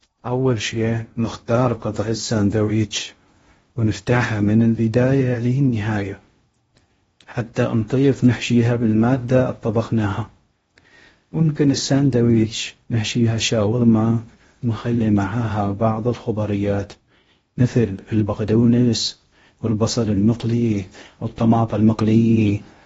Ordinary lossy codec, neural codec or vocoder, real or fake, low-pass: AAC, 24 kbps; codec, 16 kHz in and 24 kHz out, 0.6 kbps, FocalCodec, streaming, 2048 codes; fake; 10.8 kHz